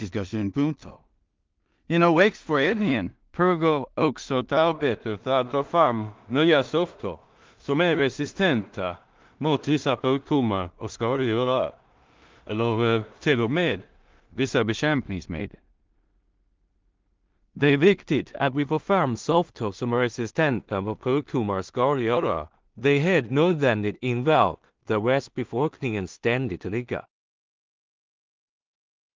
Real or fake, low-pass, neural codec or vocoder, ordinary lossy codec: fake; 7.2 kHz; codec, 16 kHz in and 24 kHz out, 0.4 kbps, LongCat-Audio-Codec, two codebook decoder; Opus, 32 kbps